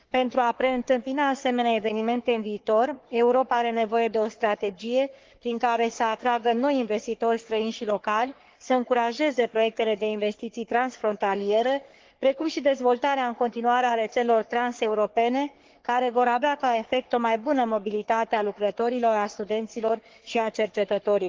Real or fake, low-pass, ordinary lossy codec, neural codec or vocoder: fake; 7.2 kHz; Opus, 16 kbps; codec, 44.1 kHz, 3.4 kbps, Pupu-Codec